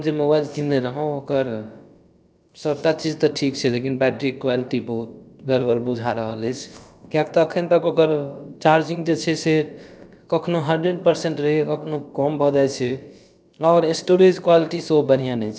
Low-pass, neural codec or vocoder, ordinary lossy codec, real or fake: none; codec, 16 kHz, about 1 kbps, DyCAST, with the encoder's durations; none; fake